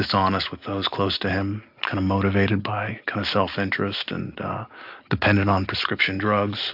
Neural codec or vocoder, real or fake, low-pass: none; real; 5.4 kHz